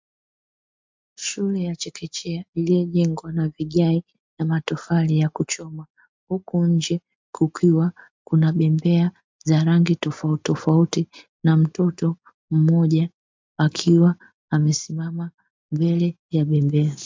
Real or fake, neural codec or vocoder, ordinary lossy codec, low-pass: real; none; MP3, 64 kbps; 7.2 kHz